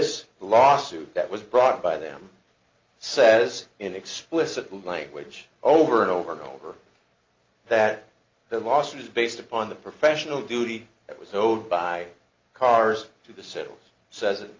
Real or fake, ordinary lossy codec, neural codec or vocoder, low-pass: real; Opus, 24 kbps; none; 7.2 kHz